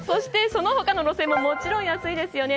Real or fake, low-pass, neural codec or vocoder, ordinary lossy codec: real; none; none; none